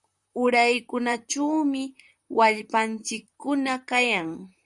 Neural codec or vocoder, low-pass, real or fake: vocoder, 44.1 kHz, 128 mel bands, Pupu-Vocoder; 10.8 kHz; fake